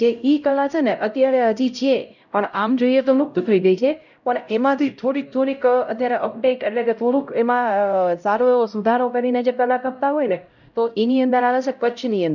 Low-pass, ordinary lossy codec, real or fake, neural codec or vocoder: 7.2 kHz; none; fake; codec, 16 kHz, 0.5 kbps, X-Codec, HuBERT features, trained on LibriSpeech